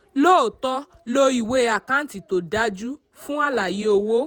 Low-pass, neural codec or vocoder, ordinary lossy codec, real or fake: none; vocoder, 48 kHz, 128 mel bands, Vocos; none; fake